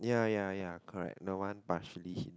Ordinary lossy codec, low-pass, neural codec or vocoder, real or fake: none; none; none; real